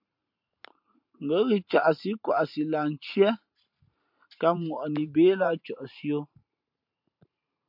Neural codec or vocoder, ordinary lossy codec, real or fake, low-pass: vocoder, 44.1 kHz, 128 mel bands every 512 samples, BigVGAN v2; MP3, 48 kbps; fake; 5.4 kHz